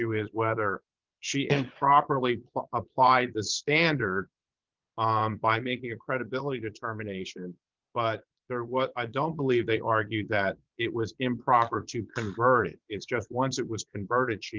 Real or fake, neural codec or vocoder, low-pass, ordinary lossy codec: fake; vocoder, 22.05 kHz, 80 mel bands, Vocos; 7.2 kHz; Opus, 32 kbps